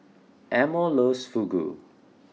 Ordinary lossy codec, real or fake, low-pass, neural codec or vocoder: none; real; none; none